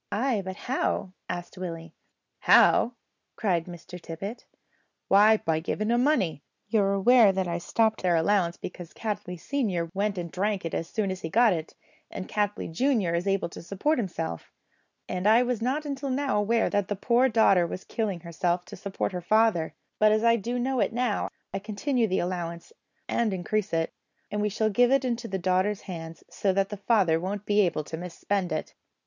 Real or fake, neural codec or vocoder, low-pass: real; none; 7.2 kHz